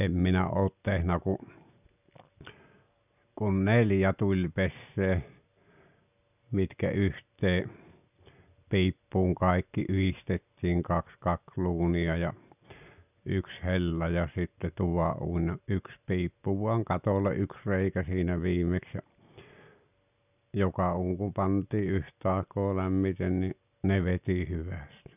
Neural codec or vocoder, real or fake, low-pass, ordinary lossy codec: none; real; 3.6 kHz; none